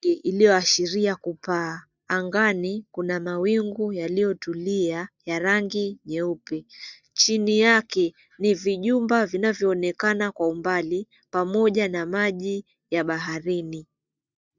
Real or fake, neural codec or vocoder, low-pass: real; none; 7.2 kHz